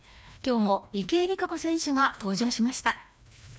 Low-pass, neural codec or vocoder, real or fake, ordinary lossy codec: none; codec, 16 kHz, 1 kbps, FreqCodec, larger model; fake; none